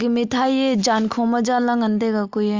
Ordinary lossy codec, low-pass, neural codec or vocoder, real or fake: Opus, 32 kbps; 7.2 kHz; none; real